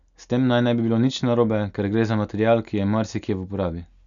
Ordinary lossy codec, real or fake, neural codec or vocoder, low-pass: none; real; none; 7.2 kHz